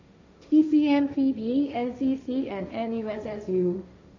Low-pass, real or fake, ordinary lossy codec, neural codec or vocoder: 7.2 kHz; fake; MP3, 64 kbps; codec, 16 kHz, 1.1 kbps, Voila-Tokenizer